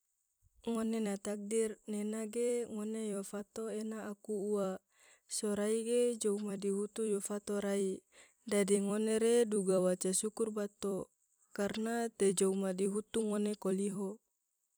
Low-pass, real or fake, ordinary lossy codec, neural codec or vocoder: none; fake; none; vocoder, 44.1 kHz, 128 mel bands every 256 samples, BigVGAN v2